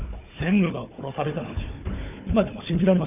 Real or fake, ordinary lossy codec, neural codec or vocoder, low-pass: fake; none; codec, 16 kHz, 4 kbps, FunCodec, trained on Chinese and English, 50 frames a second; 3.6 kHz